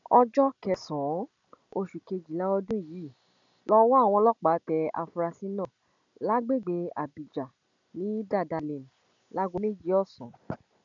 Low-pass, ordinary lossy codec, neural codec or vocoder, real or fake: 7.2 kHz; none; none; real